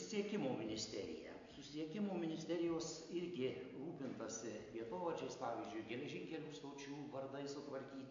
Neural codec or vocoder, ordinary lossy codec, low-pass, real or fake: none; AAC, 48 kbps; 7.2 kHz; real